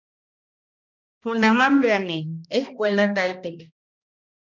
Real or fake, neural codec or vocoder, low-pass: fake; codec, 16 kHz, 1 kbps, X-Codec, HuBERT features, trained on balanced general audio; 7.2 kHz